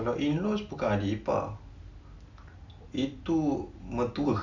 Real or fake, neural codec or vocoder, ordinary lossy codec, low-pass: real; none; none; 7.2 kHz